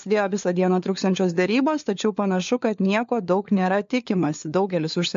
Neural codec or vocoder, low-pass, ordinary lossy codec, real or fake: codec, 16 kHz, 4 kbps, FunCodec, trained on LibriTTS, 50 frames a second; 7.2 kHz; MP3, 48 kbps; fake